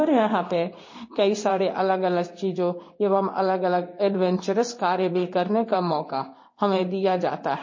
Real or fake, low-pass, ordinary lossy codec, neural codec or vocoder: fake; 7.2 kHz; MP3, 32 kbps; codec, 16 kHz in and 24 kHz out, 1 kbps, XY-Tokenizer